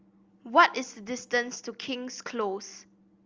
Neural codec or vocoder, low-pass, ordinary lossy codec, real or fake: none; 7.2 kHz; Opus, 32 kbps; real